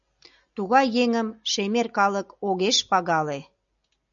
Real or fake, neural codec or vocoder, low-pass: real; none; 7.2 kHz